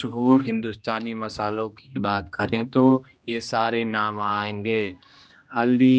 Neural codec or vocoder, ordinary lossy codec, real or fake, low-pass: codec, 16 kHz, 1 kbps, X-Codec, HuBERT features, trained on general audio; none; fake; none